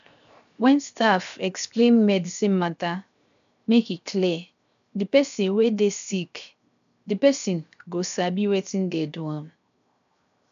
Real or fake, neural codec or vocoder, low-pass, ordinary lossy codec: fake; codec, 16 kHz, 0.7 kbps, FocalCodec; 7.2 kHz; AAC, 96 kbps